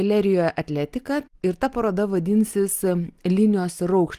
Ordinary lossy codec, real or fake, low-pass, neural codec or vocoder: Opus, 24 kbps; real; 14.4 kHz; none